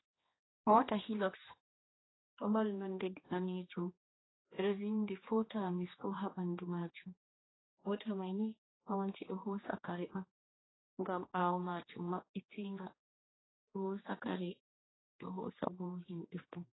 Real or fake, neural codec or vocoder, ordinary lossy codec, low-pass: fake; codec, 16 kHz, 2 kbps, X-Codec, HuBERT features, trained on general audio; AAC, 16 kbps; 7.2 kHz